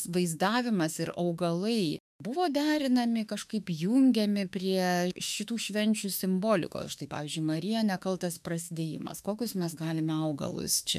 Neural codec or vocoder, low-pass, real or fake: autoencoder, 48 kHz, 32 numbers a frame, DAC-VAE, trained on Japanese speech; 14.4 kHz; fake